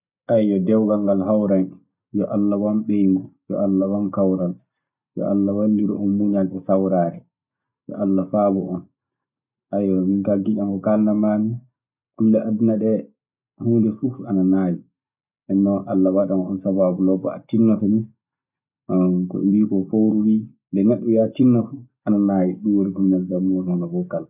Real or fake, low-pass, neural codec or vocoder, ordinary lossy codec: real; 3.6 kHz; none; none